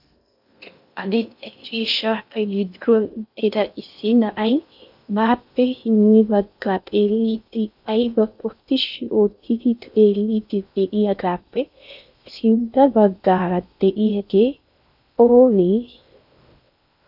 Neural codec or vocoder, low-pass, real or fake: codec, 16 kHz in and 24 kHz out, 0.6 kbps, FocalCodec, streaming, 2048 codes; 5.4 kHz; fake